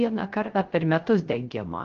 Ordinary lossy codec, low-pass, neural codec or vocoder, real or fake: Opus, 16 kbps; 7.2 kHz; codec, 16 kHz, 0.3 kbps, FocalCodec; fake